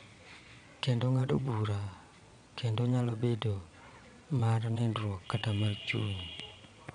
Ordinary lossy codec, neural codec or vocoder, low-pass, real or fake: none; vocoder, 22.05 kHz, 80 mel bands, Vocos; 9.9 kHz; fake